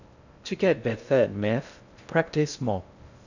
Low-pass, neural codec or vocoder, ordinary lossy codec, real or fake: 7.2 kHz; codec, 16 kHz in and 24 kHz out, 0.6 kbps, FocalCodec, streaming, 2048 codes; none; fake